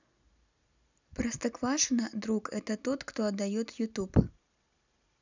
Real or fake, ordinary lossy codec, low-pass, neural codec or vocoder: real; none; 7.2 kHz; none